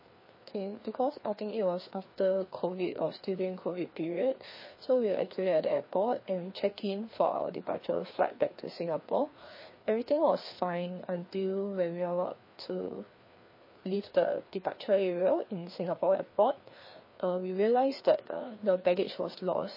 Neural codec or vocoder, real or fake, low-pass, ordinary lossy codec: codec, 16 kHz, 2 kbps, FreqCodec, larger model; fake; 5.4 kHz; MP3, 24 kbps